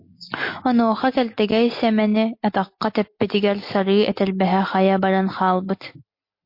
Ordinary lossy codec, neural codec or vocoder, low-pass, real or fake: MP3, 32 kbps; none; 5.4 kHz; real